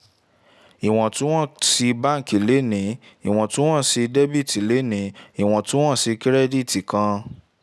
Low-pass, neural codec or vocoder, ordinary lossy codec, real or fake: none; none; none; real